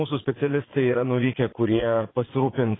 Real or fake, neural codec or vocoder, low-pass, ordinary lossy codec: fake; vocoder, 22.05 kHz, 80 mel bands, Vocos; 7.2 kHz; AAC, 16 kbps